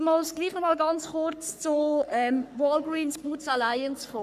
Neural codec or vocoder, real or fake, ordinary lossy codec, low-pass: codec, 44.1 kHz, 3.4 kbps, Pupu-Codec; fake; none; 14.4 kHz